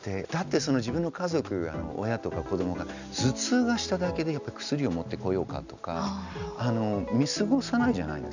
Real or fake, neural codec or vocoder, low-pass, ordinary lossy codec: real; none; 7.2 kHz; none